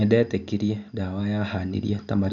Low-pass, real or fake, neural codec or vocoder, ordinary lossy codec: 7.2 kHz; real; none; none